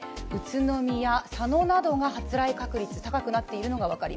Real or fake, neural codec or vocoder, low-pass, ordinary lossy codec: real; none; none; none